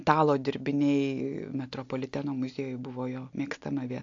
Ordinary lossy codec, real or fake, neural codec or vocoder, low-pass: AAC, 48 kbps; real; none; 7.2 kHz